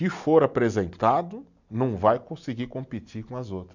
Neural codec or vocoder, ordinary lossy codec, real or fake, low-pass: none; none; real; 7.2 kHz